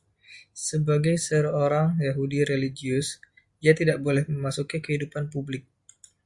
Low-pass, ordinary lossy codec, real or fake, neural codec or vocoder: 10.8 kHz; Opus, 64 kbps; real; none